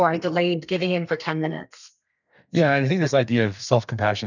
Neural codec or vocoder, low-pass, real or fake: codec, 32 kHz, 1.9 kbps, SNAC; 7.2 kHz; fake